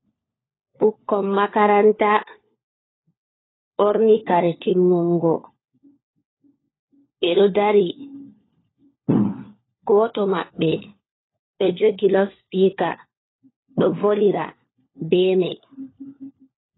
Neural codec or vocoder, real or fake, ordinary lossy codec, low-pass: codec, 16 kHz, 4 kbps, FunCodec, trained on LibriTTS, 50 frames a second; fake; AAC, 16 kbps; 7.2 kHz